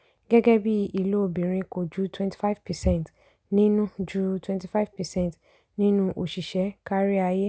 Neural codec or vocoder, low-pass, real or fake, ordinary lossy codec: none; none; real; none